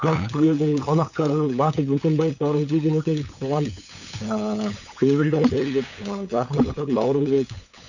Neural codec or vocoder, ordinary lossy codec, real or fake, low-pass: codec, 16 kHz, 8 kbps, FunCodec, trained on LibriTTS, 25 frames a second; none; fake; 7.2 kHz